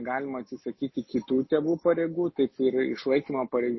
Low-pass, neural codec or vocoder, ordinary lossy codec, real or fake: 7.2 kHz; none; MP3, 24 kbps; real